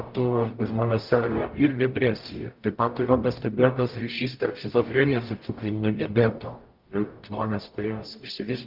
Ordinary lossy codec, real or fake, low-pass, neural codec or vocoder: Opus, 32 kbps; fake; 5.4 kHz; codec, 44.1 kHz, 0.9 kbps, DAC